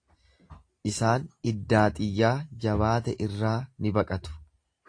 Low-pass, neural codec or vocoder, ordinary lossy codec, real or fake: 9.9 kHz; none; AAC, 48 kbps; real